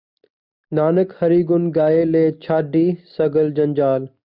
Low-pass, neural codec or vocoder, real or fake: 5.4 kHz; none; real